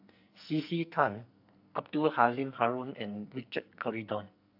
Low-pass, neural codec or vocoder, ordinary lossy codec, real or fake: 5.4 kHz; codec, 44.1 kHz, 2.6 kbps, SNAC; AAC, 48 kbps; fake